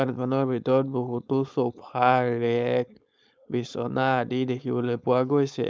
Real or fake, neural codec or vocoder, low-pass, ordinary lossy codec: fake; codec, 16 kHz, 4.8 kbps, FACodec; none; none